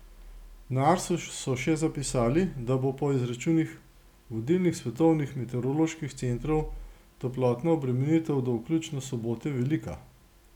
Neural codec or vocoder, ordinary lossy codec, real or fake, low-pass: none; none; real; 19.8 kHz